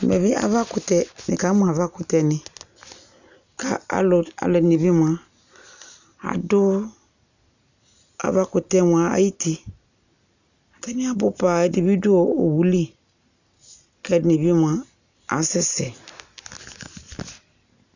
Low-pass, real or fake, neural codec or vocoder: 7.2 kHz; real; none